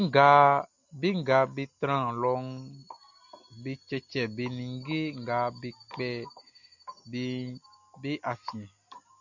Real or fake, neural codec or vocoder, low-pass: real; none; 7.2 kHz